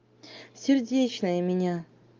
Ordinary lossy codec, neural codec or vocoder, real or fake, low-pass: Opus, 32 kbps; none; real; 7.2 kHz